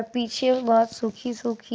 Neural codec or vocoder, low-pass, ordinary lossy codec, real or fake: none; none; none; real